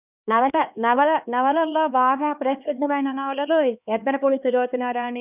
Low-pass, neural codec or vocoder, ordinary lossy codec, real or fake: 3.6 kHz; codec, 16 kHz, 2 kbps, X-Codec, HuBERT features, trained on LibriSpeech; none; fake